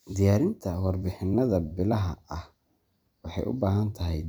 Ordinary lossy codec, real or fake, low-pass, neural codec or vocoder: none; fake; none; vocoder, 44.1 kHz, 128 mel bands every 256 samples, BigVGAN v2